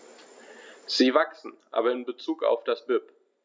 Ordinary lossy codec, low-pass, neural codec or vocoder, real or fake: none; none; none; real